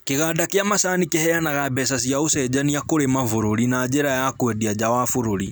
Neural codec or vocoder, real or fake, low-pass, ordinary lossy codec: none; real; none; none